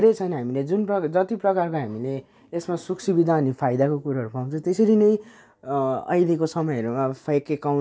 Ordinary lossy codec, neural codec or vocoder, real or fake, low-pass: none; none; real; none